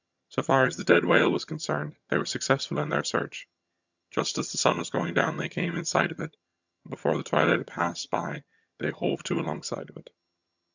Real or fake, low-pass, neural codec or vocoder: fake; 7.2 kHz; vocoder, 22.05 kHz, 80 mel bands, HiFi-GAN